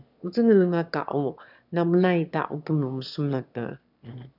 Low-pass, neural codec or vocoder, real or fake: 5.4 kHz; autoencoder, 22.05 kHz, a latent of 192 numbers a frame, VITS, trained on one speaker; fake